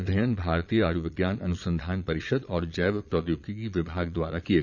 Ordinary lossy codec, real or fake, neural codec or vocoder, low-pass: none; fake; vocoder, 22.05 kHz, 80 mel bands, Vocos; 7.2 kHz